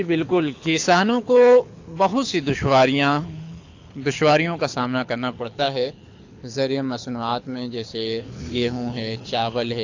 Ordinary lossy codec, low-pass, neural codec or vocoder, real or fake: AAC, 48 kbps; 7.2 kHz; codec, 24 kHz, 6 kbps, HILCodec; fake